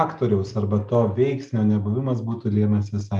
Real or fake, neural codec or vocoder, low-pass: real; none; 10.8 kHz